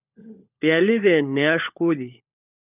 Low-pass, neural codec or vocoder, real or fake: 3.6 kHz; codec, 16 kHz, 16 kbps, FunCodec, trained on LibriTTS, 50 frames a second; fake